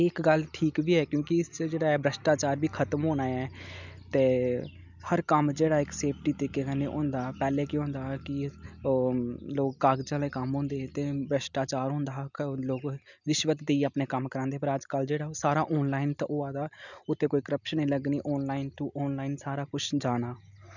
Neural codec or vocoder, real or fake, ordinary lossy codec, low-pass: none; real; none; 7.2 kHz